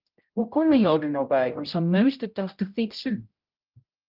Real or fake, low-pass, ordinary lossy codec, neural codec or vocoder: fake; 5.4 kHz; Opus, 24 kbps; codec, 16 kHz, 0.5 kbps, X-Codec, HuBERT features, trained on general audio